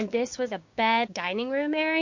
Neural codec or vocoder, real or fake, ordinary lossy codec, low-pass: codec, 16 kHz, 6 kbps, DAC; fake; MP3, 48 kbps; 7.2 kHz